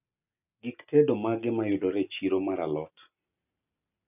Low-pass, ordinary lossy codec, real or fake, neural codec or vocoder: 3.6 kHz; none; real; none